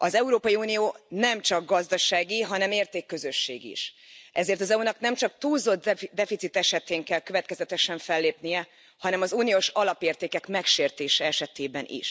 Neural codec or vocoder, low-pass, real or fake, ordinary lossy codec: none; none; real; none